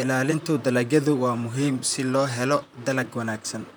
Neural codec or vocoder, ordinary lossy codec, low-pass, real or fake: vocoder, 44.1 kHz, 128 mel bands, Pupu-Vocoder; none; none; fake